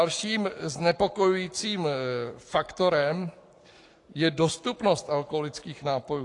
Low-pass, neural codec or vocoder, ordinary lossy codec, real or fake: 10.8 kHz; codec, 44.1 kHz, 7.8 kbps, Pupu-Codec; AAC, 48 kbps; fake